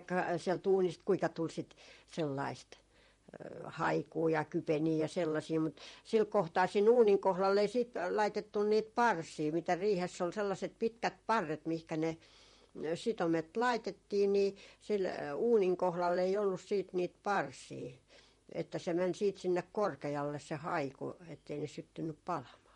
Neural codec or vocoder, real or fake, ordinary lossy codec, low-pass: vocoder, 44.1 kHz, 128 mel bands, Pupu-Vocoder; fake; MP3, 48 kbps; 19.8 kHz